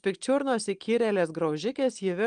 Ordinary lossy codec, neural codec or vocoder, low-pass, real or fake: Opus, 32 kbps; none; 9.9 kHz; real